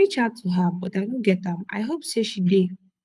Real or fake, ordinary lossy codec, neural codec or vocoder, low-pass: fake; none; codec, 24 kHz, 6 kbps, HILCodec; none